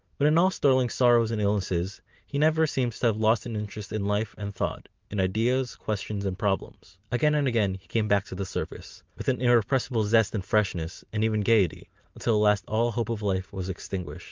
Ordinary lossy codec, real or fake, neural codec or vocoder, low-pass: Opus, 24 kbps; real; none; 7.2 kHz